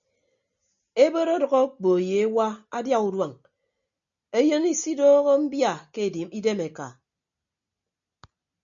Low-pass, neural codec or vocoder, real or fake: 7.2 kHz; none; real